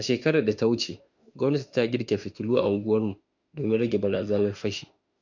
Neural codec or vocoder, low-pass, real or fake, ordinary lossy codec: autoencoder, 48 kHz, 32 numbers a frame, DAC-VAE, trained on Japanese speech; 7.2 kHz; fake; none